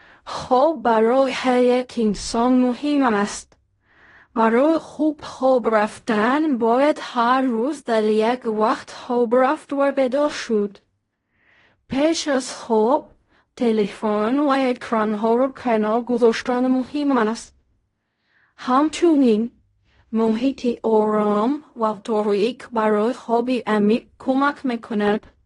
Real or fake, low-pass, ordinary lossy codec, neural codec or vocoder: fake; 10.8 kHz; AAC, 32 kbps; codec, 16 kHz in and 24 kHz out, 0.4 kbps, LongCat-Audio-Codec, fine tuned four codebook decoder